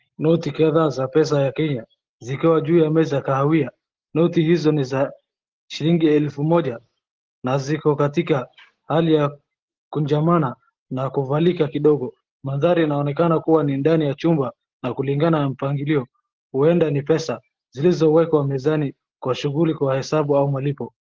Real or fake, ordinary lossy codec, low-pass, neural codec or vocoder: real; Opus, 16 kbps; 7.2 kHz; none